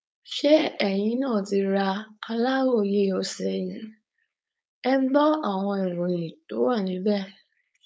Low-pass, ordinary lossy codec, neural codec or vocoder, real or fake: none; none; codec, 16 kHz, 4.8 kbps, FACodec; fake